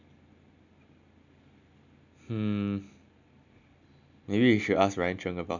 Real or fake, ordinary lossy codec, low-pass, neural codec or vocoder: real; none; 7.2 kHz; none